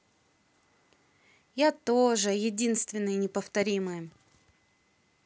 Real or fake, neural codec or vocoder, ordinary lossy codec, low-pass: real; none; none; none